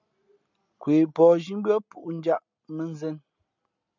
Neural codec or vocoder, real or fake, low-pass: none; real; 7.2 kHz